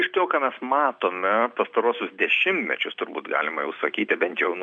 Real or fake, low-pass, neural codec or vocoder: real; 9.9 kHz; none